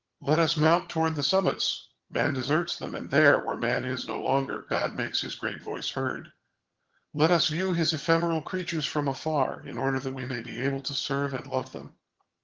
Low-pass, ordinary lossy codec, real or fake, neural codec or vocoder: 7.2 kHz; Opus, 16 kbps; fake; vocoder, 22.05 kHz, 80 mel bands, HiFi-GAN